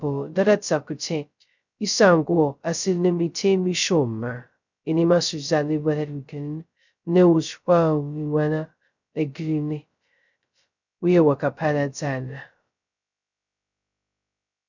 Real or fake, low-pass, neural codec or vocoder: fake; 7.2 kHz; codec, 16 kHz, 0.2 kbps, FocalCodec